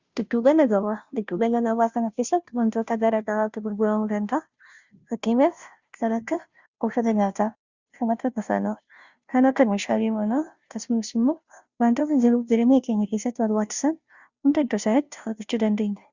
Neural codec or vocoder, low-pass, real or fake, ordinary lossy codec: codec, 16 kHz, 0.5 kbps, FunCodec, trained on Chinese and English, 25 frames a second; 7.2 kHz; fake; Opus, 64 kbps